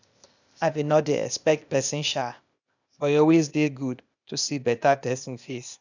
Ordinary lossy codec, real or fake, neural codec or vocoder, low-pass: none; fake; codec, 16 kHz, 0.8 kbps, ZipCodec; 7.2 kHz